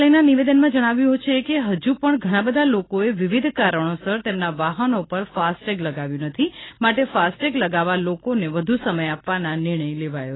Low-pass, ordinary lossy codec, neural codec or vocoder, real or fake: 7.2 kHz; AAC, 16 kbps; none; real